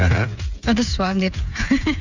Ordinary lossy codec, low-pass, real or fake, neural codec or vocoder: none; 7.2 kHz; fake; codec, 16 kHz, 8 kbps, FreqCodec, smaller model